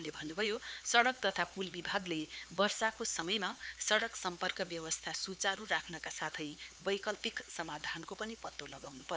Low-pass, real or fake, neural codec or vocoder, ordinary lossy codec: none; fake; codec, 16 kHz, 4 kbps, X-Codec, HuBERT features, trained on LibriSpeech; none